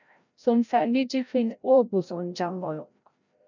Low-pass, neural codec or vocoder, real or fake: 7.2 kHz; codec, 16 kHz, 0.5 kbps, FreqCodec, larger model; fake